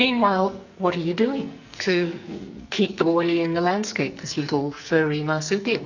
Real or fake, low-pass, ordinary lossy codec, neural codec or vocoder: fake; 7.2 kHz; Opus, 64 kbps; codec, 44.1 kHz, 2.6 kbps, SNAC